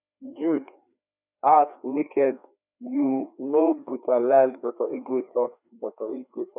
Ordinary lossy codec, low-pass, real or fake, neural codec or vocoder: none; 3.6 kHz; fake; codec, 16 kHz, 2 kbps, FreqCodec, larger model